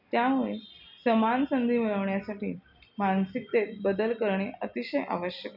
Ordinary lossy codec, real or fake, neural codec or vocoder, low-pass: none; real; none; 5.4 kHz